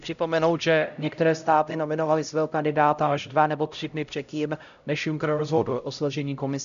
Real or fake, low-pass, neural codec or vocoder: fake; 7.2 kHz; codec, 16 kHz, 0.5 kbps, X-Codec, HuBERT features, trained on LibriSpeech